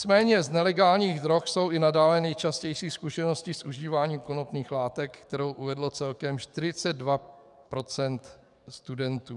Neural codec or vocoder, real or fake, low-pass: codec, 44.1 kHz, 7.8 kbps, DAC; fake; 10.8 kHz